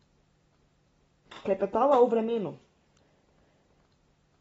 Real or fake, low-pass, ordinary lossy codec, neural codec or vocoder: real; 19.8 kHz; AAC, 24 kbps; none